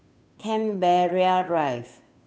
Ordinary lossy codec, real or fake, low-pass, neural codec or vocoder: none; fake; none; codec, 16 kHz, 2 kbps, FunCodec, trained on Chinese and English, 25 frames a second